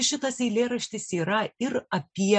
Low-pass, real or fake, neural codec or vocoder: 9.9 kHz; real; none